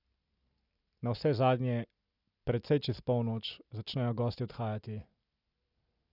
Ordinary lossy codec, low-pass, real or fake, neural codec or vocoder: none; 5.4 kHz; real; none